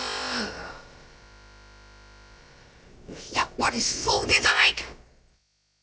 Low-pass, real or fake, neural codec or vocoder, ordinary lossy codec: none; fake; codec, 16 kHz, about 1 kbps, DyCAST, with the encoder's durations; none